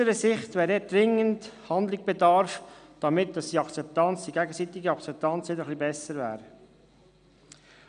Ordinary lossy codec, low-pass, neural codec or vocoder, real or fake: none; 9.9 kHz; none; real